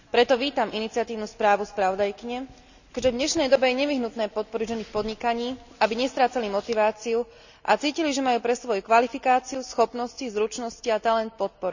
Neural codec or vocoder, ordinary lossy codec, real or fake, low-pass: none; none; real; 7.2 kHz